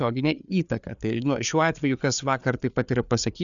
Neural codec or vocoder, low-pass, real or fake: codec, 16 kHz, 4 kbps, FreqCodec, larger model; 7.2 kHz; fake